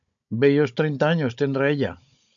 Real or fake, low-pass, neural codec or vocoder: fake; 7.2 kHz; codec, 16 kHz, 16 kbps, FunCodec, trained on Chinese and English, 50 frames a second